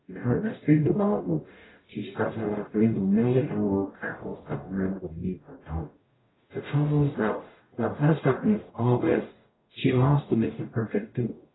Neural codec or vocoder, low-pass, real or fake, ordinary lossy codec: codec, 44.1 kHz, 0.9 kbps, DAC; 7.2 kHz; fake; AAC, 16 kbps